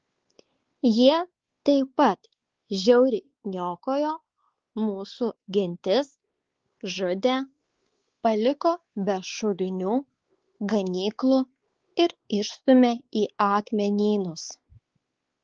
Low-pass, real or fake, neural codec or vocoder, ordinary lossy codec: 7.2 kHz; fake; codec, 16 kHz, 4 kbps, X-Codec, WavLM features, trained on Multilingual LibriSpeech; Opus, 16 kbps